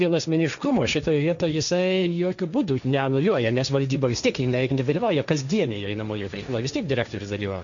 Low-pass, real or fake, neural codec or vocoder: 7.2 kHz; fake; codec, 16 kHz, 1.1 kbps, Voila-Tokenizer